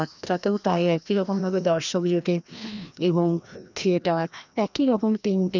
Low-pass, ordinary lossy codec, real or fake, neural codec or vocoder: 7.2 kHz; none; fake; codec, 16 kHz, 1 kbps, FreqCodec, larger model